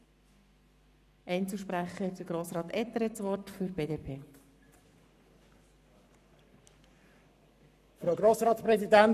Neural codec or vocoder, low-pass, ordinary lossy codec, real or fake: codec, 44.1 kHz, 7.8 kbps, Pupu-Codec; 14.4 kHz; none; fake